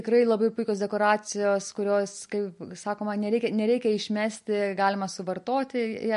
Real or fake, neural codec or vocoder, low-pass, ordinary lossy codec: real; none; 14.4 kHz; MP3, 48 kbps